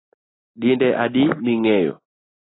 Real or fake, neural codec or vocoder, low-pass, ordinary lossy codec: real; none; 7.2 kHz; AAC, 16 kbps